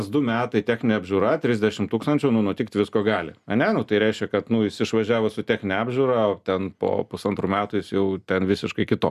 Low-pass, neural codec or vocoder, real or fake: 14.4 kHz; none; real